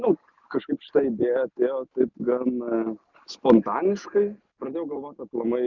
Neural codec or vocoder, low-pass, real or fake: none; 7.2 kHz; real